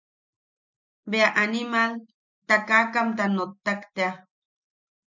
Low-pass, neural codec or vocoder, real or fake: 7.2 kHz; none; real